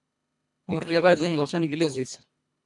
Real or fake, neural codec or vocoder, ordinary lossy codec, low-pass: fake; codec, 24 kHz, 1.5 kbps, HILCodec; MP3, 96 kbps; 10.8 kHz